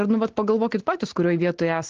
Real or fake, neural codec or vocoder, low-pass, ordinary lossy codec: real; none; 7.2 kHz; Opus, 16 kbps